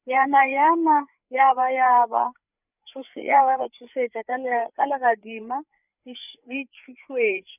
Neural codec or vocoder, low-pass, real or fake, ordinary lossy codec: codec, 16 kHz, 4 kbps, FreqCodec, larger model; 3.6 kHz; fake; none